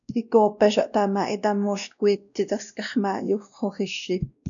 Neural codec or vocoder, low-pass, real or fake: codec, 16 kHz, 1 kbps, X-Codec, WavLM features, trained on Multilingual LibriSpeech; 7.2 kHz; fake